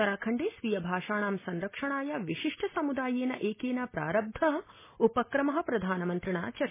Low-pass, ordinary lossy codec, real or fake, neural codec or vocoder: 3.6 kHz; MP3, 16 kbps; real; none